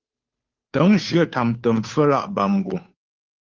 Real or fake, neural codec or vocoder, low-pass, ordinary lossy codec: fake; codec, 16 kHz, 2 kbps, FunCodec, trained on Chinese and English, 25 frames a second; 7.2 kHz; Opus, 32 kbps